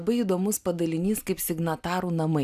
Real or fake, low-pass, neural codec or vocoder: real; 14.4 kHz; none